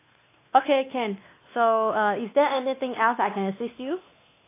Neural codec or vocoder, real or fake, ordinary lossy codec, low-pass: codec, 16 kHz, 2 kbps, X-Codec, WavLM features, trained on Multilingual LibriSpeech; fake; AAC, 24 kbps; 3.6 kHz